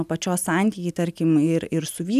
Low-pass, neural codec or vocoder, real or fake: 14.4 kHz; none; real